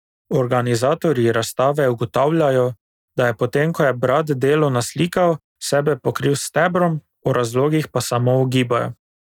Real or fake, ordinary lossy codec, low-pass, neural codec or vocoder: real; none; 19.8 kHz; none